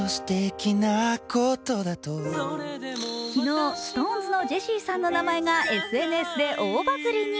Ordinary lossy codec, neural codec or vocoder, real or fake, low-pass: none; none; real; none